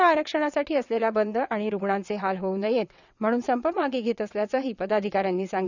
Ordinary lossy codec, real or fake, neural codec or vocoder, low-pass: none; fake; vocoder, 22.05 kHz, 80 mel bands, WaveNeXt; 7.2 kHz